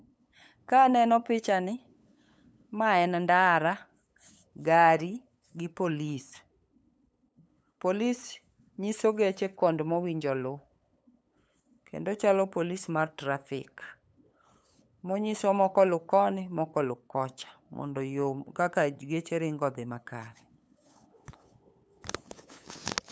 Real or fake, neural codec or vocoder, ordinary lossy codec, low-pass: fake; codec, 16 kHz, 8 kbps, FunCodec, trained on LibriTTS, 25 frames a second; none; none